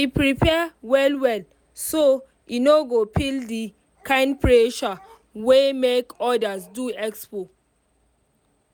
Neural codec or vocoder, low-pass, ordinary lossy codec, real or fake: none; none; none; real